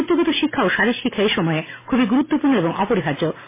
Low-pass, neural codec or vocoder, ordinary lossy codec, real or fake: 3.6 kHz; none; MP3, 16 kbps; real